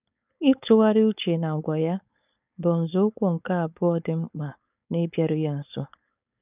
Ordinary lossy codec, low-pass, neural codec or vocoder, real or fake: none; 3.6 kHz; codec, 16 kHz, 4.8 kbps, FACodec; fake